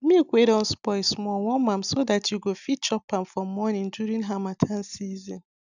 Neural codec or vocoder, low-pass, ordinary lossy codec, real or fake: none; 7.2 kHz; none; real